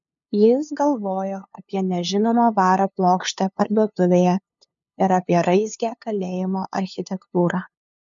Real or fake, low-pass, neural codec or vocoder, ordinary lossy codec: fake; 7.2 kHz; codec, 16 kHz, 2 kbps, FunCodec, trained on LibriTTS, 25 frames a second; MP3, 64 kbps